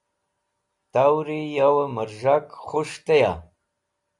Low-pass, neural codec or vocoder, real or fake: 10.8 kHz; none; real